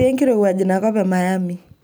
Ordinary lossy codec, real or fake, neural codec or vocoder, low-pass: none; real; none; none